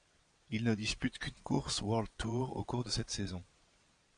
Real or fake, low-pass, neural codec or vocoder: fake; 9.9 kHz; vocoder, 22.05 kHz, 80 mel bands, Vocos